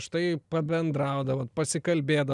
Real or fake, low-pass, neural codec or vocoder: real; 10.8 kHz; none